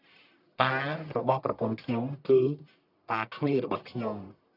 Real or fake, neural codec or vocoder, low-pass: fake; codec, 44.1 kHz, 1.7 kbps, Pupu-Codec; 5.4 kHz